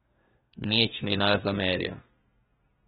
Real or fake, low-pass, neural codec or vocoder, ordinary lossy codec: fake; 14.4 kHz; codec, 32 kHz, 1.9 kbps, SNAC; AAC, 16 kbps